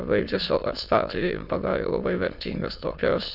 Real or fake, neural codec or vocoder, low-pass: fake; autoencoder, 22.05 kHz, a latent of 192 numbers a frame, VITS, trained on many speakers; 5.4 kHz